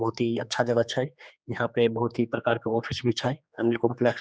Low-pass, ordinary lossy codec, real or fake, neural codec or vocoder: none; none; fake; codec, 16 kHz, 2 kbps, X-Codec, HuBERT features, trained on general audio